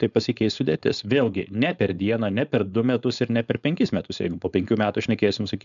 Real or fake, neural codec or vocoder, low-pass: fake; codec, 16 kHz, 4.8 kbps, FACodec; 7.2 kHz